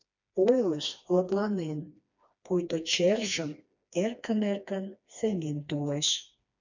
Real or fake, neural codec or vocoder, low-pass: fake; codec, 16 kHz, 2 kbps, FreqCodec, smaller model; 7.2 kHz